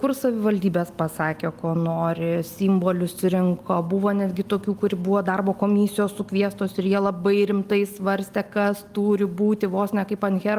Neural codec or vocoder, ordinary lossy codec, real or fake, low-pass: none; Opus, 32 kbps; real; 14.4 kHz